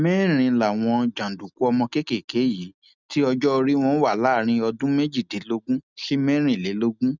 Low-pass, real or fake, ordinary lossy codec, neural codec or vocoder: 7.2 kHz; real; none; none